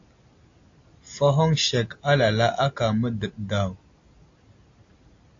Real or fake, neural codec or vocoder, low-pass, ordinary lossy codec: real; none; 7.2 kHz; AAC, 48 kbps